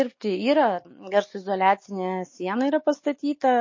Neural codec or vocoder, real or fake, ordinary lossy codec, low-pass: none; real; MP3, 32 kbps; 7.2 kHz